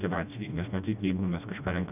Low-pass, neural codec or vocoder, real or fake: 3.6 kHz; codec, 16 kHz, 1 kbps, FreqCodec, smaller model; fake